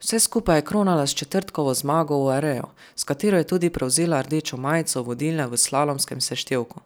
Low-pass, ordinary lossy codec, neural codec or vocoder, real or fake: none; none; none; real